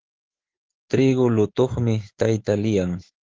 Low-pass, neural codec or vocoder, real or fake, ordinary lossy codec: 7.2 kHz; autoencoder, 48 kHz, 128 numbers a frame, DAC-VAE, trained on Japanese speech; fake; Opus, 16 kbps